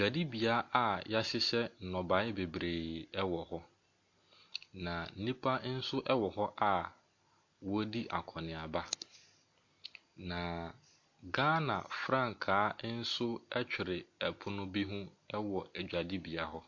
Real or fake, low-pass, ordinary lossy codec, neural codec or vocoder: real; 7.2 kHz; MP3, 48 kbps; none